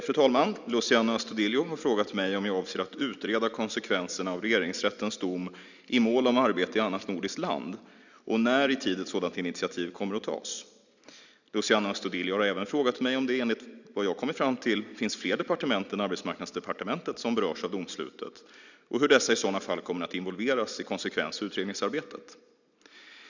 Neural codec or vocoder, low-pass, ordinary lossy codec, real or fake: none; 7.2 kHz; none; real